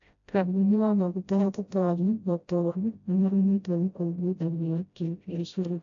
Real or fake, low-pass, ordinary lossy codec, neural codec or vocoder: fake; 7.2 kHz; AAC, 64 kbps; codec, 16 kHz, 0.5 kbps, FreqCodec, smaller model